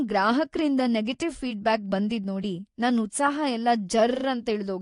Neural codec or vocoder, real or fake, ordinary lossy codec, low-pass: vocoder, 24 kHz, 100 mel bands, Vocos; fake; AAC, 48 kbps; 10.8 kHz